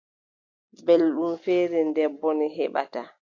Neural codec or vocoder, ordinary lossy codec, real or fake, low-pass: none; AAC, 48 kbps; real; 7.2 kHz